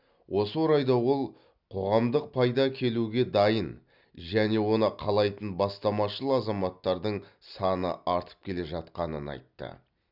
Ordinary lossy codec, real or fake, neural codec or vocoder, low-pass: none; real; none; 5.4 kHz